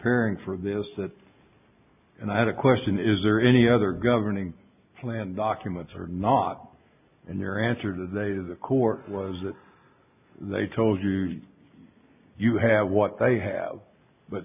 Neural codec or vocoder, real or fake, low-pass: none; real; 3.6 kHz